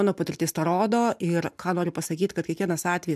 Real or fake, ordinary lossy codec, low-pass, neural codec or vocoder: real; MP3, 96 kbps; 14.4 kHz; none